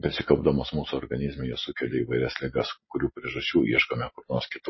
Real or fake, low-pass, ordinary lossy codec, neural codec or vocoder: real; 7.2 kHz; MP3, 24 kbps; none